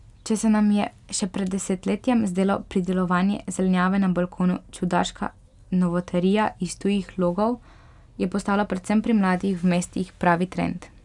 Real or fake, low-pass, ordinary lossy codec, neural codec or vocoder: real; 10.8 kHz; none; none